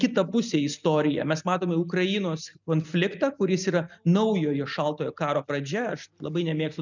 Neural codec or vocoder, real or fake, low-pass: none; real; 7.2 kHz